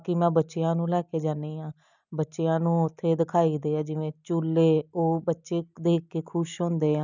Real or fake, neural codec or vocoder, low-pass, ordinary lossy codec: real; none; 7.2 kHz; none